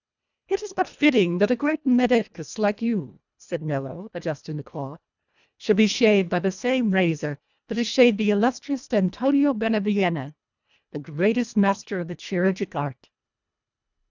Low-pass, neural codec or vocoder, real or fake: 7.2 kHz; codec, 24 kHz, 1.5 kbps, HILCodec; fake